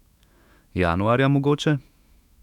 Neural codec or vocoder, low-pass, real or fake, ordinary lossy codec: autoencoder, 48 kHz, 128 numbers a frame, DAC-VAE, trained on Japanese speech; 19.8 kHz; fake; none